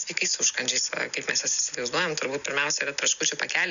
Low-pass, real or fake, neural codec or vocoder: 7.2 kHz; real; none